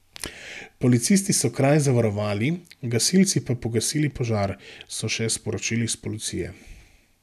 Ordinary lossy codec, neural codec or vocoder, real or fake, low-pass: none; none; real; 14.4 kHz